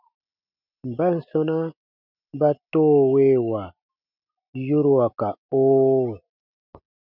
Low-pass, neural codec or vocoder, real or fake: 5.4 kHz; none; real